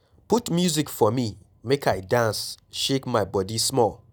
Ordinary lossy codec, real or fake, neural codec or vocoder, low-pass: none; real; none; none